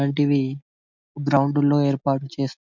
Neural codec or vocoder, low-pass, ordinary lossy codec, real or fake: none; 7.2 kHz; none; real